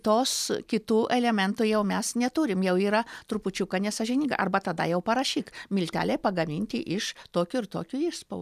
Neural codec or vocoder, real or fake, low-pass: none; real; 14.4 kHz